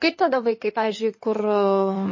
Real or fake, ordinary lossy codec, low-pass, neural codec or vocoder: fake; MP3, 32 kbps; 7.2 kHz; codec, 16 kHz in and 24 kHz out, 2.2 kbps, FireRedTTS-2 codec